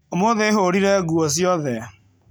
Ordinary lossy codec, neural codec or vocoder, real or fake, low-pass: none; none; real; none